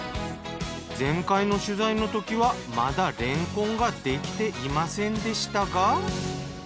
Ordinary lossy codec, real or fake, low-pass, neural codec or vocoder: none; real; none; none